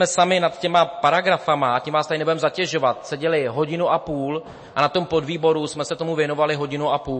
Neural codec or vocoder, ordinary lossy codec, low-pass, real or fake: none; MP3, 32 kbps; 9.9 kHz; real